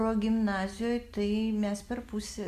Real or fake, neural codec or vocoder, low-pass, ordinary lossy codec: real; none; 14.4 kHz; Opus, 64 kbps